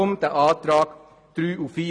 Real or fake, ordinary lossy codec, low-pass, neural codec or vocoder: real; none; 9.9 kHz; none